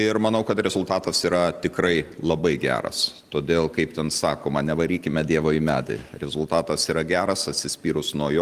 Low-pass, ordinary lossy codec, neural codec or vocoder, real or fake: 14.4 kHz; Opus, 24 kbps; none; real